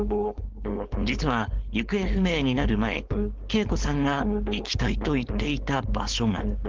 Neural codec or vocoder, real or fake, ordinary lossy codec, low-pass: codec, 16 kHz, 4.8 kbps, FACodec; fake; Opus, 16 kbps; 7.2 kHz